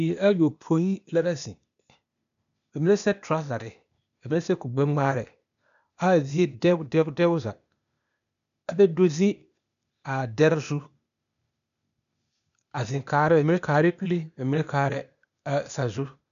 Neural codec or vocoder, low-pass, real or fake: codec, 16 kHz, 0.8 kbps, ZipCodec; 7.2 kHz; fake